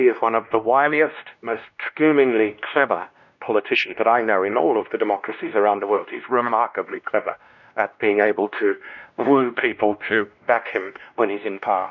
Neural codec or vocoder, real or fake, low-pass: codec, 16 kHz, 1 kbps, X-Codec, WavLM features, trained on Multilingual LibriSpeech; fake; 7.2 kHz